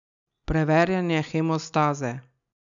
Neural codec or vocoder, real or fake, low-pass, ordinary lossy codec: none; real; 7.2 kHz; none